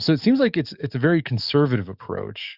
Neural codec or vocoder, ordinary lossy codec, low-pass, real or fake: codec, 44.1 kHz, 7.8 kbps, DAC; Opus, 64 kbps; 5.4 kHz; fake